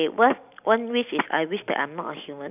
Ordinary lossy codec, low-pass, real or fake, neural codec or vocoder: none; 3.6 kHz; real; none